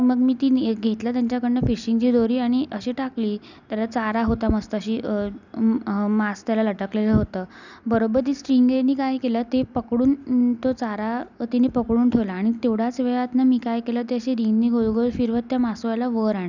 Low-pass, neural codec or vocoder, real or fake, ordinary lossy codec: 7.2 kHz; none; real; none